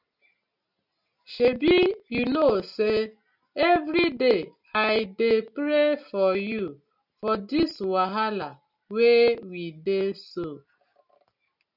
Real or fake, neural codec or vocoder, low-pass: real; none; 5.4 kHz